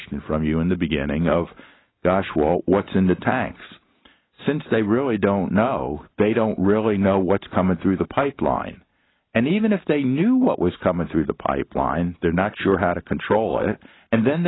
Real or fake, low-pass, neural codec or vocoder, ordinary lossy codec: real; 7.2 kHz; none; AAC, 16 kbps